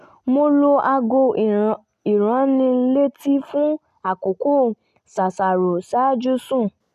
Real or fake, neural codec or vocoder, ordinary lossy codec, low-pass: real; none; MP3, 96 kbps; 14.4 kHz